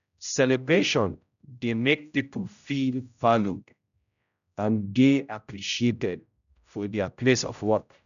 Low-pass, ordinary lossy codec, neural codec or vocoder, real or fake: 7.2 kHz; none; codec, 16 kHz, 0.5 kbps, X-Codec, HuBERT features, trained on general audio; fake